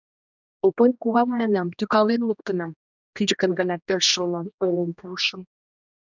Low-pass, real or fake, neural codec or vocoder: 7.2 kHz; fake; codec, 16 kHz, 1 kbps, X-Codec, HuBERT features, trained on general audio